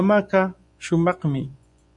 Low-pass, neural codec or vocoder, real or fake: 10.8 kHz; vocoder, 24 kHz, 100 mel bands, Vocos; fake